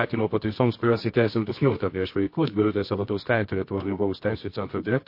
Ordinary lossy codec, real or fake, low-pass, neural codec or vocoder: MP3, 32 kbps; fake; 5.4 kHz; codec, 24 kHz, 0.9 kbps, WavTokenizer, medium music audio release